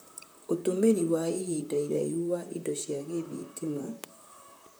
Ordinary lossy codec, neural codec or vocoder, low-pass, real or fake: none; vocoder, 44.1 kHz, 128 mel bands, Pupu-Vocoder; none; fake